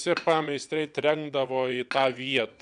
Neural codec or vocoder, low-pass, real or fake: vocoder, 22.05 kHz, 80 mel bands, WaveNeXt; 9.9 kHz; fake